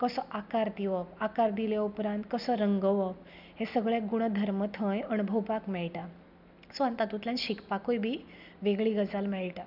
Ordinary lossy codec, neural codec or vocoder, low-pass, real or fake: none; none; 5.4 kHz; real